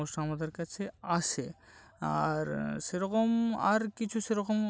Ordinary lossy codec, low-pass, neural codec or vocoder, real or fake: none; none; none; real